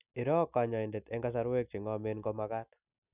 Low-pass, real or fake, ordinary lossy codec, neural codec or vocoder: 3.6 kHz; real; none; none